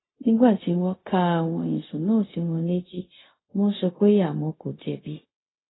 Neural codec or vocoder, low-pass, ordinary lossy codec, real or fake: codec, 16 kHz, 0.4 kbps, LongCat-Audio-Codec; 7.2 kHz; AAC, 16 kbps; fake